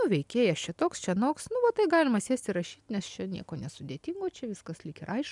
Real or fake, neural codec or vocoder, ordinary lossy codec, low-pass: real; none; MP3, 96 kbps; 10.8 kHz